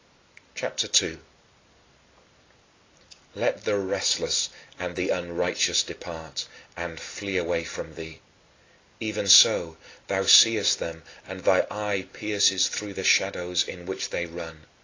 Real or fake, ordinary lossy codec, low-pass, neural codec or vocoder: real; AAC, 32 kbps; 7.2 kHz; none